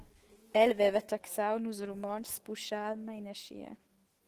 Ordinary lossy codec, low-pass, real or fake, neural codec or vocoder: Opus, 16 kbps; 14.4 kHz; fake; autoencoder, 48 kHz, 128 numbers a frame, DAC-VAE, trained on Japanese speech